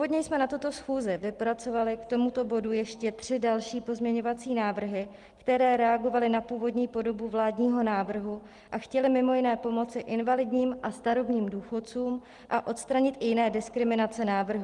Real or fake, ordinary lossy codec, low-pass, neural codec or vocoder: real; Opus, 24 kbps; 10.8 kHz; none